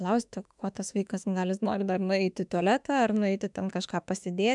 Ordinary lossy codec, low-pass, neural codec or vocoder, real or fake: MP3, 96 kbps; 10.8 kHz; autoencoder, 48 kHz, 32 numbers a frame, DAC-VAE, trained on Japanese speech; fake